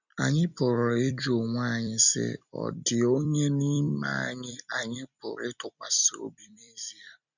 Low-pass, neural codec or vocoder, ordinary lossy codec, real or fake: 7.2 kHz; vocoder, 44.1 kHz, 128 mel bands every 256 samples, BigVGAN v2; none; fake